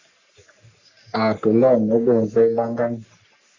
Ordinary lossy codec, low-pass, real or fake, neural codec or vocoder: Opus, 64 kbps; 7.2 kHz; fake; codec, 44.1 kHz, 3.4 kbps, Pupu-Codec